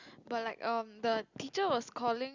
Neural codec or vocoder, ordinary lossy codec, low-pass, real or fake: none; Opus, 64 kbps; 7.2 kHz; real